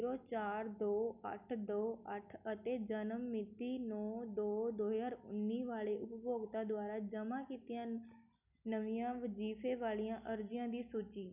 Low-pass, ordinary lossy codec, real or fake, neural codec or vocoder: 3.6 kHz; none; real; none